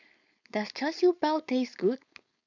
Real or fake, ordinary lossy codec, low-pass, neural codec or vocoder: fake; none; 7.2 kHz; codec, 16 kHz, 4.8 kbps, FACodec